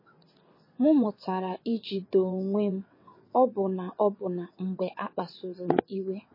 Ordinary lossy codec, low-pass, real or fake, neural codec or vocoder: MP3, 24 kbps; 5.4 kHz; fake; vocoder, 22.05 kHz, 80 mel bands, WaveNeXt